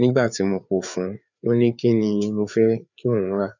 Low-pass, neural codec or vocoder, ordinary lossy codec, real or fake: 7.2 kHz; codec, 16 kHz, 4 kbps, FreqCodec, larger model; none; fake